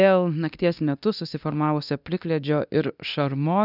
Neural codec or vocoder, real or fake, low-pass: codec, 24 kHz, 0.9 kbps, DualCodec; fake; 5.4 kHz